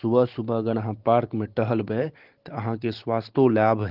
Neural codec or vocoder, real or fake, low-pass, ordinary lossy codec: none; real; 5.4 kHz; Opus, 16 kbps